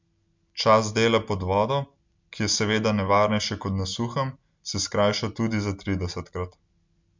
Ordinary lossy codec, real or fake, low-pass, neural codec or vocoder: MP3, 64 kbps; real; 7.2 kHz; none